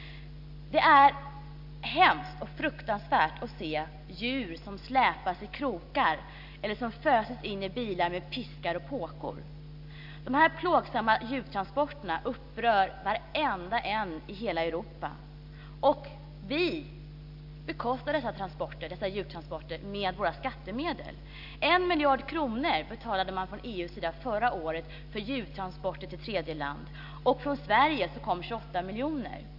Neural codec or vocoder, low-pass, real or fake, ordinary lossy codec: none; 5.4 kHz; real; AAC, 48 kbps